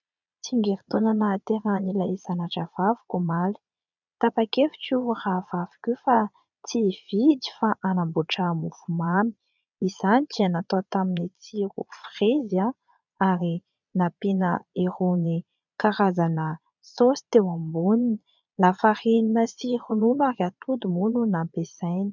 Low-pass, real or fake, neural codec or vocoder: 7.2 kHz; fake; vocoder, 22.05 kHz, 80 mel bands, Vocos